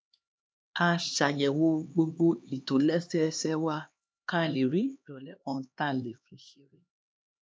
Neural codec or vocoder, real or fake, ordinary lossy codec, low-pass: codec, 16 kHz, 2 kbps, X-Codec, HuBERT features, trained on LibriSpeech; fake; none; none